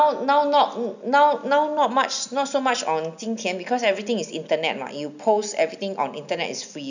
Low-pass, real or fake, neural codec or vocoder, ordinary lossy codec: 7.2 kHz; real; none; none